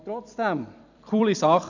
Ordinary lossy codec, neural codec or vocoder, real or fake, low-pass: none; vocoder, 44.1 kHz, 128 mel bands every 256 samples, BigVGAN v2; fake; 7.2 kHz